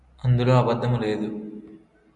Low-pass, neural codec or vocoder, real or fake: 10.8 kHz; none; real